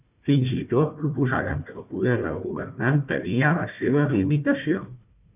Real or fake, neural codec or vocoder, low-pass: fake; codec, 16 kHz, 1 kbps, FunCodec, trained on Chinese and English, 50 frames a second; 3.6 kHz